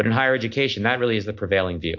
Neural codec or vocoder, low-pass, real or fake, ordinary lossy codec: none; 7.2 kHz; real; MP3, 48 kbps